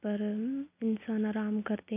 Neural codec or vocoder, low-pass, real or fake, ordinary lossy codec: none; 3.6 kHz; real; none